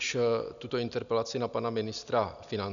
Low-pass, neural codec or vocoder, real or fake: 7.2 kHz; none; real